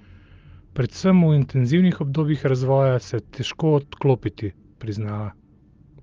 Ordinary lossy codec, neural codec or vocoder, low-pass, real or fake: Opus, 32 kbps; none; 7.2 kHz; real